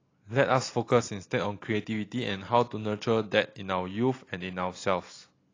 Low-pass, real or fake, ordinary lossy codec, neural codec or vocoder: 7.2 kHz; real; AAC, 32 kbps; none